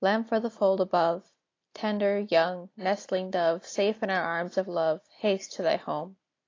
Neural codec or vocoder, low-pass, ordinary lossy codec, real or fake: none; 7.2 kHz; AAC, 32 kbps; real